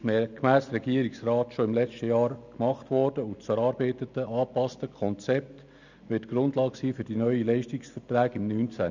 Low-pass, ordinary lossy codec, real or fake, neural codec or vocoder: 7.2 kHz; none; real; none